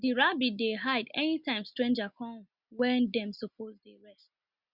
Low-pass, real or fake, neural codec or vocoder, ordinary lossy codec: 5.4 kHz; real; none; Opus, 64 kbps